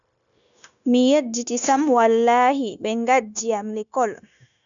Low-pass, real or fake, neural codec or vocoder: 7.2 kHz; fake; codec, 16 kHz, 0.9 kbps, LongCat-Audio-Codec